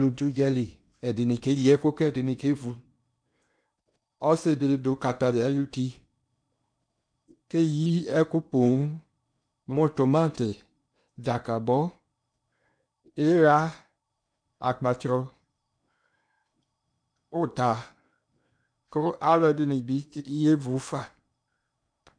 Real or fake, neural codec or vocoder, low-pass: fake; codec, 16 kHz in and 24 kHz out, 0.8 kbps, FocalCodec, streaming, 65536 codes; 9.9 kHz